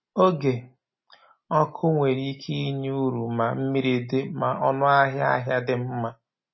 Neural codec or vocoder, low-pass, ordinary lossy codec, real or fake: none; 7.2 kHz; MP3, 24 kbps; real